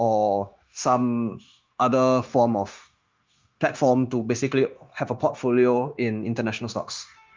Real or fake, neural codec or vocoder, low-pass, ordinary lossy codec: fake; codec, 16 kHz, 0.9 kbps, LongCat-Audio-Codec; 7.2 kHz; Opus, 32 kbps